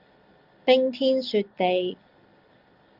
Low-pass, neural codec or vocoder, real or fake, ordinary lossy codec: 5.4 kHz; none; real; Opus, 32 kbps